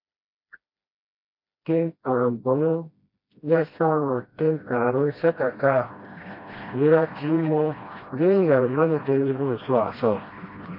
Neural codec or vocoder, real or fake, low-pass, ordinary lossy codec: codec, 16 kHz, 1 kbps, FreqCodec, smaller model; fake; 5.4 kHz; MP3, 32 kbps